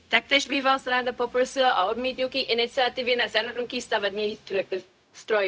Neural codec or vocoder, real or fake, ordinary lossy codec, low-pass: codec, 16 kHz, 0.4 kbps, LongCat-Audio-Codec; fake; none; none